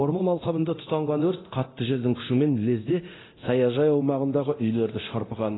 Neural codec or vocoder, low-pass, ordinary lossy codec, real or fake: codec, 24 kHz, 0.9 kbps, DualCodec; 7.2 kHz; AAC, 16 kbps; fake